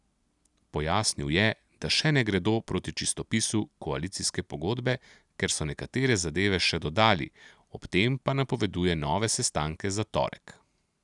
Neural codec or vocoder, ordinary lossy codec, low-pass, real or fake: none; none; 10.8 kHz; real